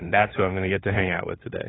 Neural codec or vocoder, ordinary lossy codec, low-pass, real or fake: codec, 16 kHz, 8 kbps, FunCodec, trained on LibriTTS, 25 frames a second; AAC, 16 kbps; 7.2 kHz; fake